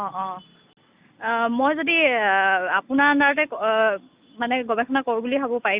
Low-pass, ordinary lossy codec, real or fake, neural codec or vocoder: 3.6 kHz; Opus, 64 kbps; real; none